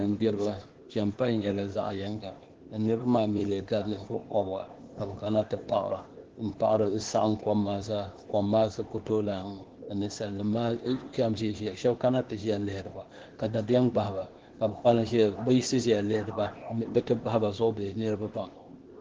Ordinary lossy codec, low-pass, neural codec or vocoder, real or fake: Opus, 16 kbps; 7.2 kHz; codec, 16 kHz, 0.8 kbps, ZipCodec; fake